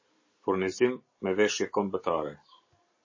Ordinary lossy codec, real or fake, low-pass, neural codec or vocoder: MP3, 32 kbps; real; 7.2 kHz; none